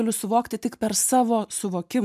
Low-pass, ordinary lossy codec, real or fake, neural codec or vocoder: 14.4 kHz; MP3, 96 kbps; real; none